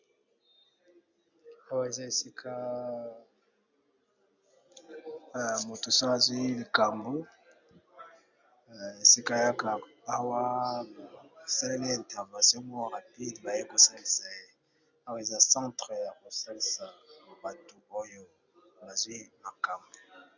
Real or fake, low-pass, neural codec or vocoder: real; 7.2 kHz; none